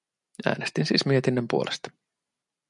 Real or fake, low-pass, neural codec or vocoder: real; 10.8 kHz; none